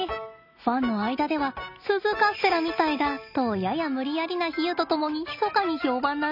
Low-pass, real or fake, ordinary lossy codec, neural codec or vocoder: 5.4 kHz; real; none; none